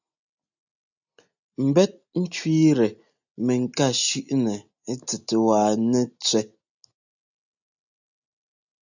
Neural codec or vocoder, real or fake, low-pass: none; real; 7.2 kHz